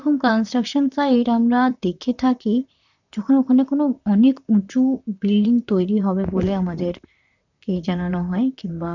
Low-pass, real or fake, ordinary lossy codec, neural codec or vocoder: 7.2 kHz; fake; none; vocoder, 44.1 kHz, 128 mel bands, Pupu-Vocoder